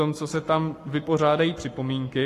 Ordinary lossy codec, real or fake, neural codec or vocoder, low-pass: AAC, 48 kbps; fake; codec, 44.1 kHz, 7.8 kbps, Pupu-Codec; 14.4 kHz